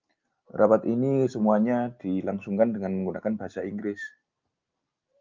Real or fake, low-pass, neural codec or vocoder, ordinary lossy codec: real; 7.2 kHz; none; Opus, 24 kbps